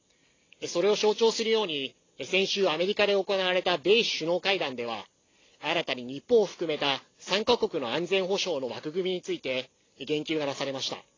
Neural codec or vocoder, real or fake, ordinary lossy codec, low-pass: none; real; AAC, 32 kbps; 7.2 kHz